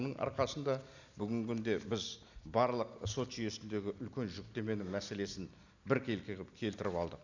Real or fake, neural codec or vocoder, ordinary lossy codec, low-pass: real; none; none; 7.2 kHz